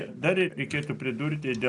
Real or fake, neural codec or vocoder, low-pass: real; none; 10.8 kHz